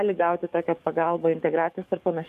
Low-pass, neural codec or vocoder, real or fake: 14.4 kHz; codec, 44.1 kHz, 7.8 kbps, DAC; fake